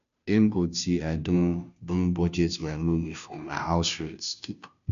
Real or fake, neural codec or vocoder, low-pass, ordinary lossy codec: fake; codec, 16 kHz, 0.5 kbps, FunCodec, trained on Chinese and English, 25 frames a second; 7.2 kHz; MP3, 96 kbps